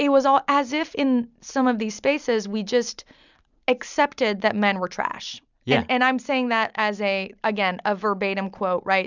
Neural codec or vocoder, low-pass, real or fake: none; 7.2 kHz; real